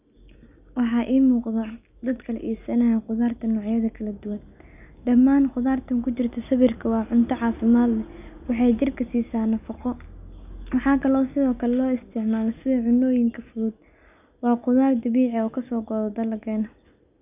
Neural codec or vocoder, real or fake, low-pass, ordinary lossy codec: none; real; 3.6 kHz; none